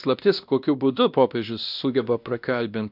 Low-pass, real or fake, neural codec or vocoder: 5.4 kHz; fake; codec, 16 kHz, 1 kbps, X-Codec, WavLM features, trained on Multilingual LibriSpeech